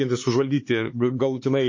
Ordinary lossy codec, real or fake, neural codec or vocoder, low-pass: MP3, 32 kbps; fake; codec, 16 kHz, 4 kbps, X-Codec, HuBERT features, trained on LibriSpeech; 7.2 kHz